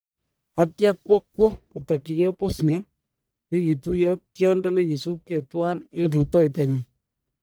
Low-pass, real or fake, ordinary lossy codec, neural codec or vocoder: none; fake; none; codec, 44.1 kHz, 1.7 kbps, Pupu-Codec